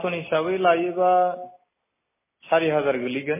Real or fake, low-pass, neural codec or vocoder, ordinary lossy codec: real; 3.6 kHz; none; MP3, 16 kbps